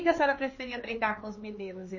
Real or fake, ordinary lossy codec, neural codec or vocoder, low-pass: fake; MP3, 32 kbps; codec, 16 kHz, 4 kbps, X-Codec, HuBERT features, trained on LibriSpeech; 7.2 kHz